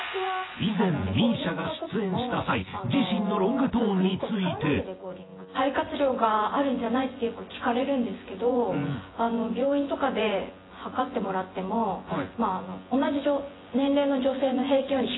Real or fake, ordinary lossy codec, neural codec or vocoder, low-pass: fake; AAC, 16 kbps; vocoder, 24 kHz, 100 mel bands, Vocos; 7.2 kHz